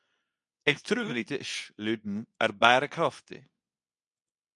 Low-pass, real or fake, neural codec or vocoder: 10.8 kHz; fake; codec, 24 kHz, 0.9 kbps, WavTokenizer, medium speech release version 2